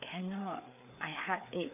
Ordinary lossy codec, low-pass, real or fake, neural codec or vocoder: none; 3.6 kHz; fake; codec, 16 kHz, 4 kbps, FreqCodec, larger model